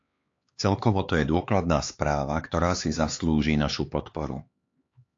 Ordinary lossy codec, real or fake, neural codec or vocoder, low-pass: AAC, 48 kbps; fake; codec, 16 kHz, 4 kbps, X-Codec, HuBERT features, trained on LibriSpeech; 7.2 kHz